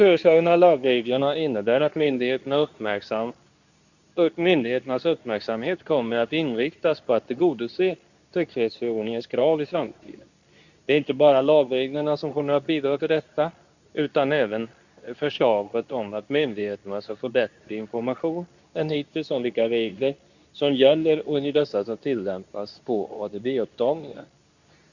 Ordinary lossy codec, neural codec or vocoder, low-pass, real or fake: none; codec, 24 kHz, 0.9 kbps, WavTokenizer, medium speech release version 2; 7.2 kHz; fake